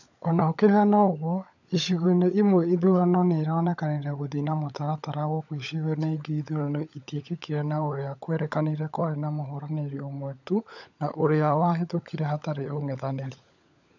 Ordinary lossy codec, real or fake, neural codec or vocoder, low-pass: none; fake; codec, 16 kHz, 16 kbps, FunCodec, trained on LibriTTS, 50 frames a second; 7.2 kHz